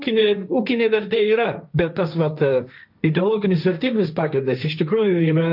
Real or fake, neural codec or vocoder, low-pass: fake; codec, 16 kHz, 1.1 kbps, Voila-Tokenizer; 5.4 kHz